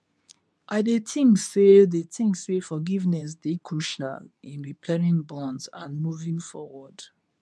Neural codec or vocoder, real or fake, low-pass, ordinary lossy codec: codec, 24 kHz, 0.9 kbps, WavTokenizer, medium speech release version 2; fake; none; none